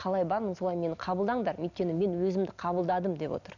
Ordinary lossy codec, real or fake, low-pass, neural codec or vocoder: none; real; 7.2 kHz; none